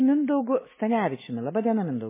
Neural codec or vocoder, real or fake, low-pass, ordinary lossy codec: none; real; 3.6 kHz; MP3, 16 kbps